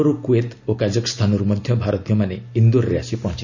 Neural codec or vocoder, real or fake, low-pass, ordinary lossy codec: none; real; 7.2 kHz; none